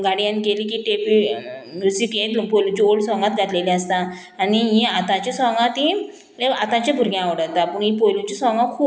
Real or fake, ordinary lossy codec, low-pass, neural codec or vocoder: real; none; none; none